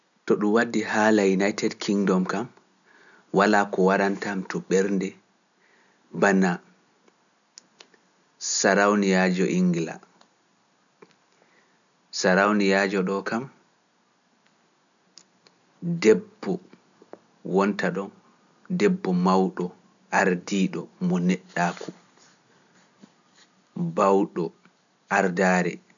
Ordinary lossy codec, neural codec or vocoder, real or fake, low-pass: none; none; real; 7.2 kHz